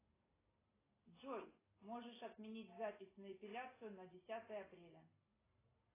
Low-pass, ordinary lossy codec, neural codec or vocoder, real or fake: 3.6 kHz; AAC, 16 kbps; none; real